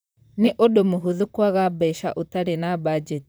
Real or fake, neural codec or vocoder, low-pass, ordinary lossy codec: fake; vocoder, 44.1 kHz, 128 mel bands, Pupu-Vocoder; none; none